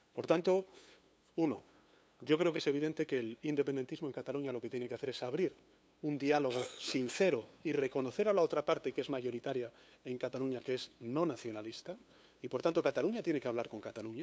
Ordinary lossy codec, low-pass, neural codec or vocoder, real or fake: none; none; codec, 16 kHz, 2 kbps, FunCodec, trained on LibriTTS, 25 frames a second; fake